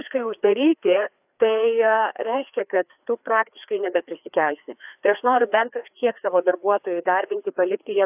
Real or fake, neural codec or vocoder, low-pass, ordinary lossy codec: fake; codec, 16 kHz, 2 kbps, FreqCodec, larger model; 3.6 kHz; AAC, 32 kbps